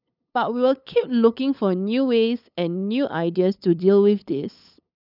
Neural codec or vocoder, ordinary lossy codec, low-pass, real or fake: codec, 16 kHz, 8 kbps, FunCodec, trained on LibriTTS, 25 frames a second; none; 5.4 kHz; fake